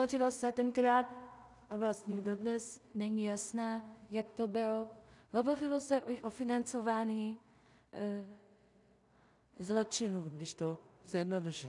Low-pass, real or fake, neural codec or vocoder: 10.8 kHz; fake; codec, 16 kHz in and 24 kHz out, 0.4 kbps, LongCat-Audio-Codec, two codebook decoder